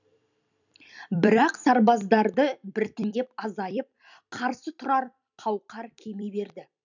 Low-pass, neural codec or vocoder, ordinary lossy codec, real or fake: 7.2 kHz; vocoder, 44.1 kHz, 128 mel bands every 256 samples, BigVGAN v2; none; fake